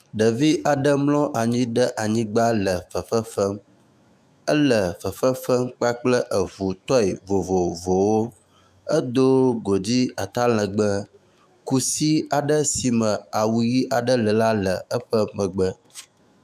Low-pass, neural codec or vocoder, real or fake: 14.4 kHz; autoencoder, 48 kHz, 128 numbers a frame, DAC-VAE, trained on Japanese speech; fake